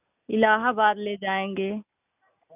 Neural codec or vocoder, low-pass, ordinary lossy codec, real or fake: none; 3.6 kHz; none; real